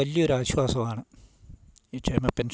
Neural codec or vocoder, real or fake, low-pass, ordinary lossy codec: none; real; none; none